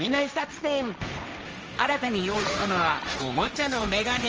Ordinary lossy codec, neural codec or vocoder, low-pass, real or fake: Opus, 24 kbps; codec, 16 kHz, 1.1 kbps, Voila-Tokenizer; 7.2 kHz; fake